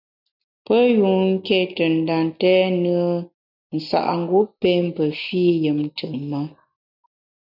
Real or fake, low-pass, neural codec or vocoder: real; 5.4 kHz; none